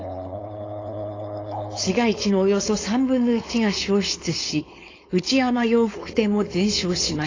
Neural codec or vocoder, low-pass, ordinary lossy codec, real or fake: codec, 16 kHz, 4.8 kbps, FACodec; 7.2 kHz; AAC, 32 kbps; fake